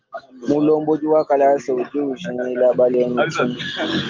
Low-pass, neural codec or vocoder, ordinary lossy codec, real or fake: 7.2 kHz; none; Opus, 32 kbps; real